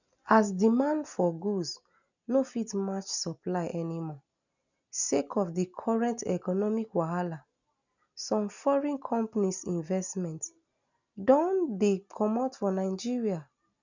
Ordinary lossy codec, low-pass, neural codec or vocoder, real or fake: none; 7.2 kHz; none; real